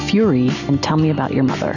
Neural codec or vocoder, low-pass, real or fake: none; 7.2 kHz; real